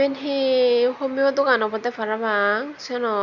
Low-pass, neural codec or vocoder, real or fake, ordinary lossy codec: 7.2 kHz; none; real; none